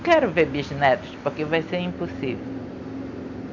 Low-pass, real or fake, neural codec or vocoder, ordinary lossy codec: 7.2 kHz; real; none; none